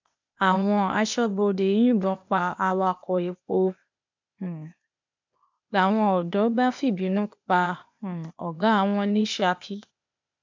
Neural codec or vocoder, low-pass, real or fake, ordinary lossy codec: codec, 16 kHz, 0.8 kbps, ZipCodec; 7.2 kHz; fake; MP3, 64 kbps